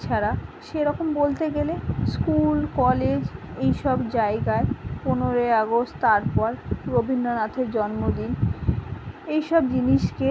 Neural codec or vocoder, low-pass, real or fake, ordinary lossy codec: none; none; real; none